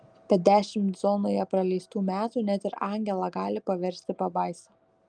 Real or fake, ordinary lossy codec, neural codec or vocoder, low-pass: real; Opus, 32 kbps; none; 9.9 kHz